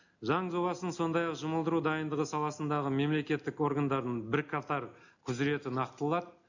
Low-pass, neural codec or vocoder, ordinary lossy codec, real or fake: 7.2 kHz; none; none; real